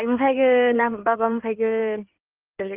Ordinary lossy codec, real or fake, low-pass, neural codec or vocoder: Opus, 24 kbps; fake; 3.6 kHz; codec, 16 kHz in and 24 kHz out, 2.2 kbps, FireRedTTS-2 codec